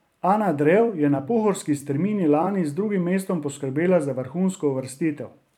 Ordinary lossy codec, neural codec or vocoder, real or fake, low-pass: none; vocoder, 44.1 kHz, 128 mel bands every 256 samples, BigVGAN v2; fake; 19.8 kHz